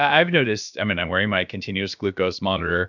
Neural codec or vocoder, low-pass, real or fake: codec, 16 kHz, about 1 kbps, DyCAST, with the encoder's durations; 7.2 kHz; fake